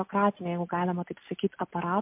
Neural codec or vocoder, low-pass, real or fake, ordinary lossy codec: none; 3.6 kHz; real; MP3, 32 kbps